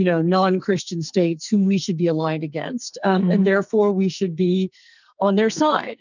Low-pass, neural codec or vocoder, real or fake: 7.2 kHz; codec, 44.1 kHz, 2.6 kbps, SNAC; fake